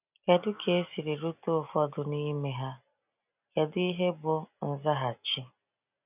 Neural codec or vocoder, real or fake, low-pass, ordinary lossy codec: none; real; 3.6 kHz; none